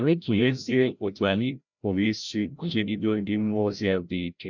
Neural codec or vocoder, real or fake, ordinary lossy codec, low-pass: codec, 16 kHz, 0.5 kbps, FreqCodec, larger model; fake; none; 7.2 kHz